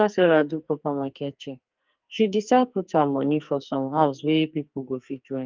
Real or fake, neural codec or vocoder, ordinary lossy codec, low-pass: fake; codec, 44.1 kHz, 2.6 kbps, SNAC; Opus, 24 kbps; 7.2 kHz